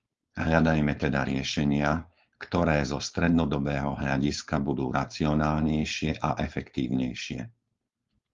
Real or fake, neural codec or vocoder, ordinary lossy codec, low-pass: fake; codec, 16 kHz, 4.8 kbps, FACodec; Opus, 32 kbps; 7.2 kHz